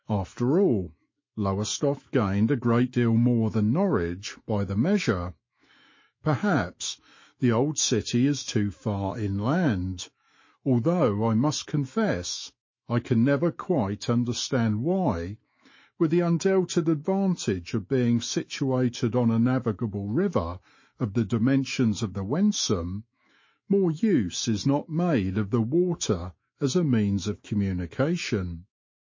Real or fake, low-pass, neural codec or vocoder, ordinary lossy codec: fake; 7.2 kHz; autoencoder, 48 kHz, 128 numbers a frame, DAC-VAE, trained on Japanese speech; MP3, 32 kbps